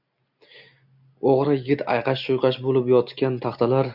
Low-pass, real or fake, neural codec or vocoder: 5.4 kHz; real; none